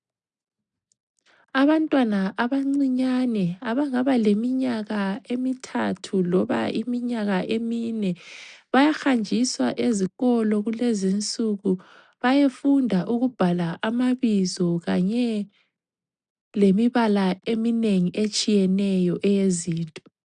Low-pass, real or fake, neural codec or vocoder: 9.9 kHz; real; none